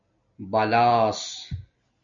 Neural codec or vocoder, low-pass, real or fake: none; 7.2 kHz; real